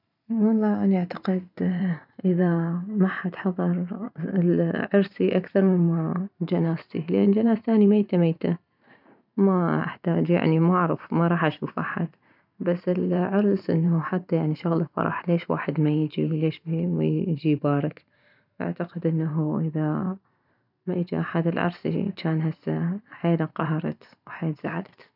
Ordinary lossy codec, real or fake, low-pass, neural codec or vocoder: none; fake; 5.4 kHz; vocoder, 44.1 kHz, 128 mel bands every 512 samples, BigVGAN v2